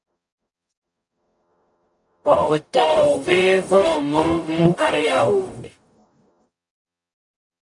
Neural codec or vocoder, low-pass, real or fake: codec, 44.1 kHz, 0.9 kbps, DAC; 10.8 kHz; fake